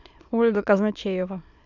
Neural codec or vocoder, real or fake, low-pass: autoencoder, 22.05 kHz, a latent of 192 numbers a frame, VITS, trained on many speakers; fake; 7.2 kHz